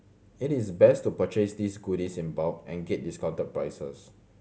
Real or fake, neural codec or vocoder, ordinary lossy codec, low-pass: real; none; none; none